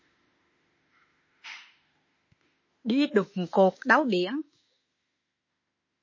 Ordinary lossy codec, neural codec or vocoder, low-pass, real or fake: MP3, 32 kbps; autoencoder, 48 kHz, 32 numbers a frame, DAC-VAE, trained on Japanese speech; 7.2 kHz; fake